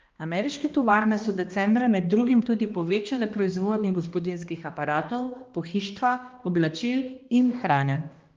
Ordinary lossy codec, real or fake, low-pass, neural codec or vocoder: Opus, 24 kbps; fake; 7.2 kHz; codec, 16 kHz, 1 kbps, X-Codec, HuBERT features, trained on balanced general audio